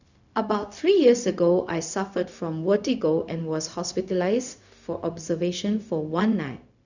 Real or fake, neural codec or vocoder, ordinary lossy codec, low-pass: fake; codec, 16 kHz, 0.4 kbps, LongCat-Audio-Codec; none; 7.2 kHz